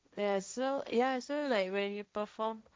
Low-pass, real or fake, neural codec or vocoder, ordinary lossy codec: none; fake; codec, 16 kHz, 1.1 kbps, Voila-Tokenizer; none